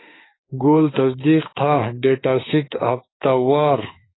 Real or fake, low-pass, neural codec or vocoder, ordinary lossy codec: fake; 7.2 kHz; autoencoder, 48 kHz, 32 numbers a frame, DAC-VAE, trained on Japanese speech; AAC, 16 kbps